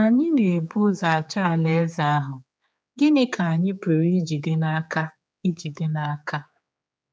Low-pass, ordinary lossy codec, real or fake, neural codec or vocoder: none; none; fake; codec, 16 kHz, 4 kbps, X-Codec, HuBERT features, trained on general audio